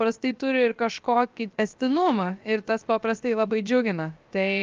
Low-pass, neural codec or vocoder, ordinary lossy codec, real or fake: 7.2 kHz; codec, 16 kHz, 0.7 kbps, FocalCodec; Opus, 24 kbps; fake